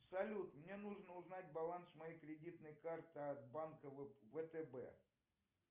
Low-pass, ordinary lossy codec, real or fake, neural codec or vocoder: 3.6 kHz; Opus, 32 kbps; real; none